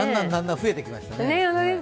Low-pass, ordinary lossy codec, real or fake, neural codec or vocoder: none; none; real; none